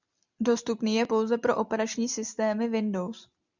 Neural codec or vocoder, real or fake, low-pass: none; real; 7.2 kHz